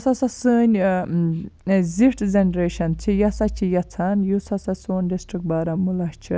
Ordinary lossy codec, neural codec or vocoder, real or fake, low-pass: none; none; real; none